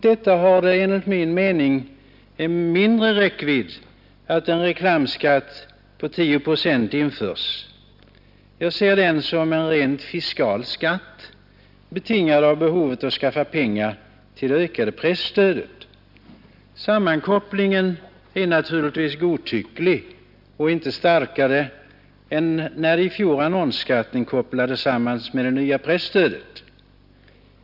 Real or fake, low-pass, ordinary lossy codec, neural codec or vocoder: real; 5.4 kHz; none; none